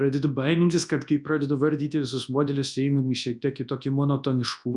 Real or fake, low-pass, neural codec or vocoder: fake; 10.8 kHz; codec, 24 kHz, 0.9 kbps, WavTokenizer, large speech release